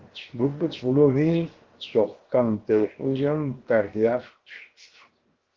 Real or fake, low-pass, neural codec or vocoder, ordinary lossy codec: fake; 7.2 kHz; codec, 16 kHz, 0.7 kbps, FocalCodec; Opus, 16 kbps